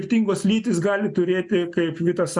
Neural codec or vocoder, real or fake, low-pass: none; real; 10.8 kHz